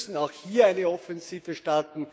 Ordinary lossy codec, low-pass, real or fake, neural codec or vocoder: none; none; fake; codec, 16 kHz, 6 kbps, DAC